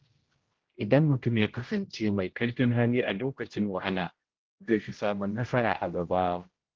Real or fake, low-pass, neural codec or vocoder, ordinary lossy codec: fake; 7.2 kHz; codec, 16 kHz, 0.5 kbps, X-Codec, HuBERT features, trained on general audio; Opus, 16 kbps